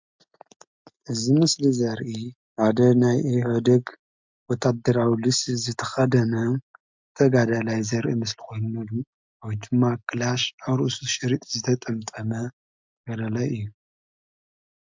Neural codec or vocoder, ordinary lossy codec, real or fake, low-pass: none; MP3, 64 kbps; real; 7.2 kHz